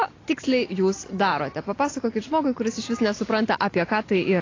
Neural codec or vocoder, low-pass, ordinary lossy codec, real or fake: none; 7.2 kHz; AAC, 32 kbps; real